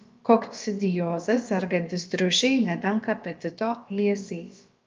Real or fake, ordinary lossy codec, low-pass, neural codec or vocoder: fake; Opus, 24 kbps; 7.2 kHz; codec, 16 kHz, about 1 kbps, DyCAST, with the encoder's durations